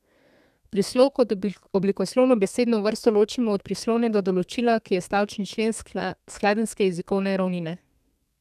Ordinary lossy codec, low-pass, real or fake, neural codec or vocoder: none; 14.4 kHz; fake; codec, 32 kHz, 1.9 kbps, SNAC